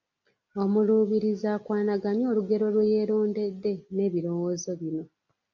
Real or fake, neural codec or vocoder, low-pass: real; none; 7.2 kHz